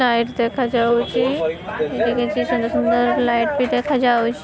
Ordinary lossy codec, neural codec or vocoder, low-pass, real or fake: none; none; none; real